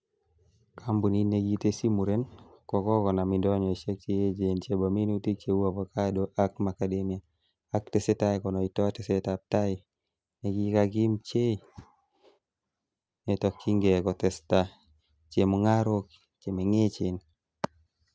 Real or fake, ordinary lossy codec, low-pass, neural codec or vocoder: real; none; none; none